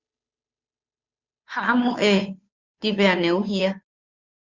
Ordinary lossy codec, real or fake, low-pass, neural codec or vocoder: Opus, 64 kbps; fake; 7.2 kHz; codec, 16 kHz, 2 kbps, FunCodec, trained on Chinese and English, 25 frames a second